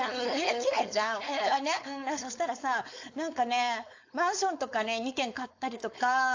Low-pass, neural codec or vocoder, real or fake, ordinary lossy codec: 7.2 kHz; codec, 16 kHz, 4.8 kbps, FACodec; fake; AAC, 48 kbps